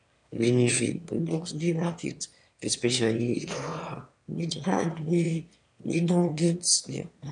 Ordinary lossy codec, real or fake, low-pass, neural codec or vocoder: MP3, 96 kbps; fake; 9.9 kHz; autoencoder, 22.05 kHz, a latent of 192 numbers a frame, VITS, trained on one speaker